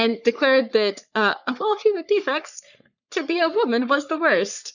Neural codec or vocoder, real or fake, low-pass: codec, 44.1 kHz, 3.4 kbps, Pupu-Codec; fake; 7.2 kHz